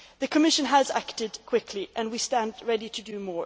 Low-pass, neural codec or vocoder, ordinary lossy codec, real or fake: none; none; none; real